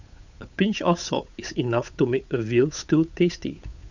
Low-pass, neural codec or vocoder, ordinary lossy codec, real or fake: 7.2 kHz; codec, 16 kHz, 8 kbps, FunCodec, trained on Chinese and English, 25 frames a second; none; fake